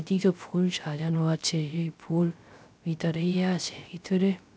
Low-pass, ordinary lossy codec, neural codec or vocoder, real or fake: none; none; codec, 16 kHz, 0.3 kbps, FocalCodec; fake